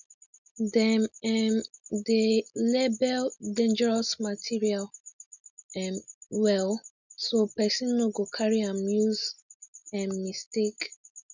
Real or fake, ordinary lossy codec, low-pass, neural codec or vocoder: real; none; 7.2 kHz; none